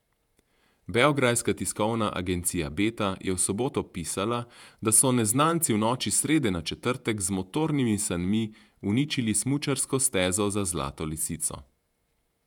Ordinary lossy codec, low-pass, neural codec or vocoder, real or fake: none; 19.8 kHz; vocoder, 48 kHz, 128 mel bands, Vocos; fake